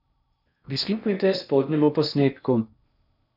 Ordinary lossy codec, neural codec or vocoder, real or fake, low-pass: none; codec, 16 kHz in and 24 kHz out, 0.6 kbps, FocalCodec, streaming, 4096 codes; fake; 5.4 kHz